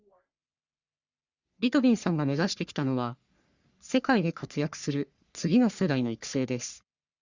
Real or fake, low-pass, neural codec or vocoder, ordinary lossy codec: fake; 7.2 kHz; codec, 44.1 kHz, 3.4 kbps, Pupu-Codec; Opus, 64 kbps